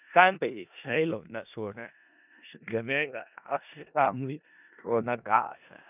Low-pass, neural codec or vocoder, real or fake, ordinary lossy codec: 3.6 kHz; codec, 16 kHz in and 24 kHz out, 0.4 kbps, LongCat-Audio-Codec, four codebook decoder; fake; none